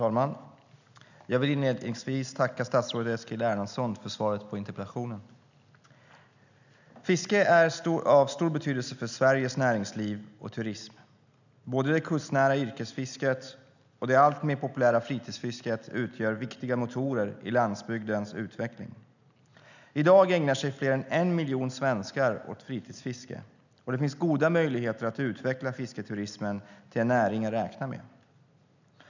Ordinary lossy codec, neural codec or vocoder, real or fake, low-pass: none; none; real; 7.2 kHz